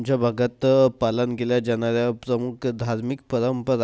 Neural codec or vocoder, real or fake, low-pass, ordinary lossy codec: none; real; none; none